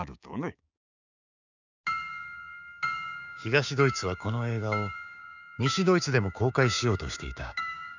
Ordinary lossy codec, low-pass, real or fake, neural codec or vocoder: none; 7.2 kHz; fake; codec, 16 kHz, 6 kbps, DAC